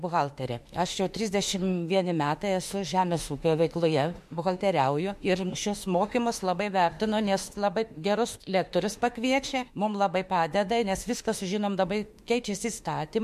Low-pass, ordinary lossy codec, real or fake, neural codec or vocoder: 14.4 kHz; MP3, 64 kbps; fake; autoencoder, 48 kHz, 32 numbers a frame, DAC-VAE, trained on Japanese speech